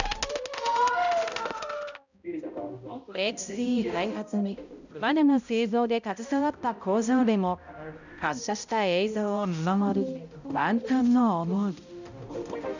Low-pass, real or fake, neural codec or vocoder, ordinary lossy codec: 7.2 kHz; fake; codec, 16 kHz, 0.5 kbps, X-Codec, HuBERT features, trained on balanced general audio; none